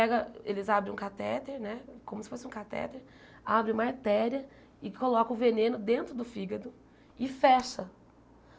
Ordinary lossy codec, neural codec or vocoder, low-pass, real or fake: none; none; none; real